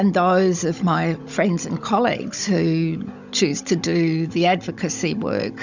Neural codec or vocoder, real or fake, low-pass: codec, 16 kHz, 16 kbps, FunCodec, trained on Chinese and English, 50 frames a second; fake; 7.2 kHz